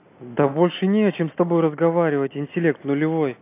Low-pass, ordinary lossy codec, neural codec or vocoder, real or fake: 3.6 kHz; AAC, 24 kbps; none; real